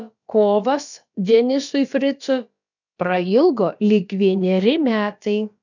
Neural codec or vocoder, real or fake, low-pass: codec, 16 kHz, about 1 kbps, DyCAST, with the encoder's durations; fake; 7.2 kHz